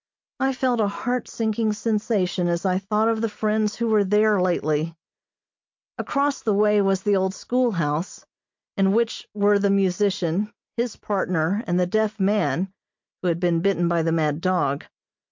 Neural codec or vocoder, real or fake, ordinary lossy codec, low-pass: none; real; MP3, 64 kbps; 7.2 kHz